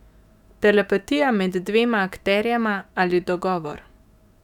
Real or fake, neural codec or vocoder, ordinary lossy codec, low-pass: fake; autoencoder, 48 kHz, 128 numbers a frame, DAC-VAE, trained on Japanese speech; none; 19.8 kHz